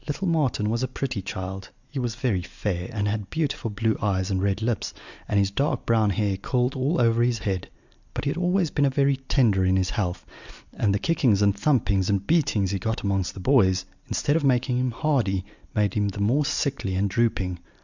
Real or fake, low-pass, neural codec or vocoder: real; 7.2 kHz; none